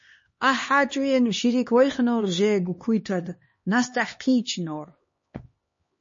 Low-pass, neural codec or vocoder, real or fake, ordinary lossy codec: 7.2 kHz; codec, 16 kHz, 2 kbps, X-Codec, HuBERT features, trained on LibriSpeech; fake; MP3, 32 kbps